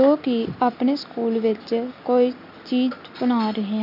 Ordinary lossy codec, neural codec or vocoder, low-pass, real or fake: none; none; 5.4 kHz; real